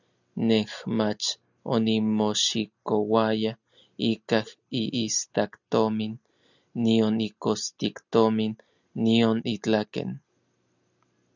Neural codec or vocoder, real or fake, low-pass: none; real; 7.2 kHz